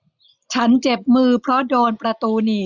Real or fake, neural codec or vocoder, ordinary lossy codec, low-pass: real; none; none; 7.2 kHz